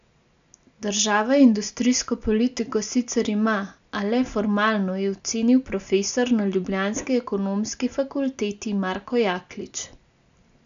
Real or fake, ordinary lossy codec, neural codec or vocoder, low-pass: real; AAC, 96 kbps; none; 7.2 kHz